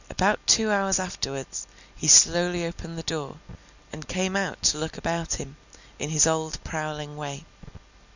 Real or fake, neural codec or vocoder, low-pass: real; none; 7.2 kHz